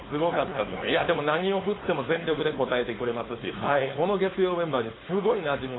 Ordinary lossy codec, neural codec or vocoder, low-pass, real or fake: AAC, 16 kbps; codec, 16 kHz, 4.8 kbps, FACodec; 7.2 kHz; fake